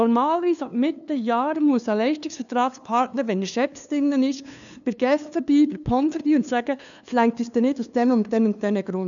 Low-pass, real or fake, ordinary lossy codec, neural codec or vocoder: 7.2 kHz; fake; none; codec, 16 kHz, 2 kbps, FunCodec, trained on LibriTTS, 25 frames a second